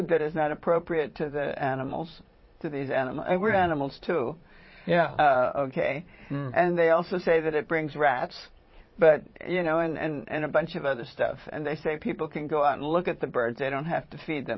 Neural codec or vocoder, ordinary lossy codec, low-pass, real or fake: vocoder, 22.05 kHz, 80 mel bands, Vocos; MP3, 24 kbps; 7.2 kHz; fake